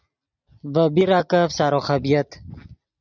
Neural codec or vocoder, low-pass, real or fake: none; 7.2 kHz; real